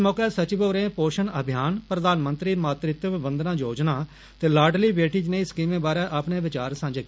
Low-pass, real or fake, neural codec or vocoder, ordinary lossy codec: none; real; none; none